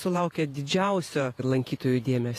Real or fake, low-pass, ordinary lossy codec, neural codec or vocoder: fake; 14.4 kHz; AAC, 48 kbps; vocoder, 48 kHz, 128 mel bands, Vocos